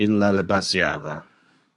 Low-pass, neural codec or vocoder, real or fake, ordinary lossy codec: 10.8 kHz; codec, 24 kHz, 1 kbps, SNAC; fake; AAC, 64 kbps